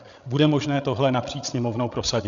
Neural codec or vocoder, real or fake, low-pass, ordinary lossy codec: codec, 16 kHz, 16 kbps, FreqCodec, larger model; fake; 7.2 kHz; Opus, 64 kbps